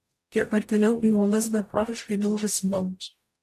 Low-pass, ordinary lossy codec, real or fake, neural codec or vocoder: 14.4 kHz; AAC, 64 kbps; fake; codec, 44.1 kHz, 0.9 kbps, DAC